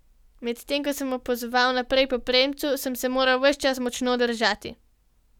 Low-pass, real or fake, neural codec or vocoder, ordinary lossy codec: 19.8 kHz; real; none; none